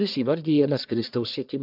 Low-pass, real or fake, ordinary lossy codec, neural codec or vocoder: 5.4 kHz; fake; AAC, 48 kbps; codec, 44.1 kHz, 1.7 kbps, Pupu-Codec